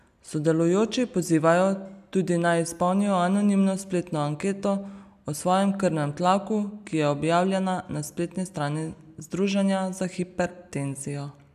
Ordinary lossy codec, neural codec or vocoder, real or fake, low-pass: none; none; real; 14.4 kHz